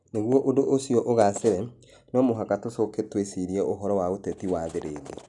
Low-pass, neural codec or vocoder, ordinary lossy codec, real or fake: 10.8 kHz; none; none; real